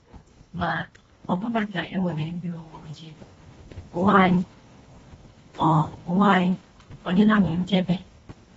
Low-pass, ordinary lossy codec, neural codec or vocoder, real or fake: 10.8 kHz; AAC, 24 kbps; codec, 24 kHz, 1.5 kbps, HILCodec; fake